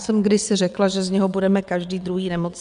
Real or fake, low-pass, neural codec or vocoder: fake; 9.9 kHz; vocoder, 22.05 kHz, 80 mel bands, WaveNeXt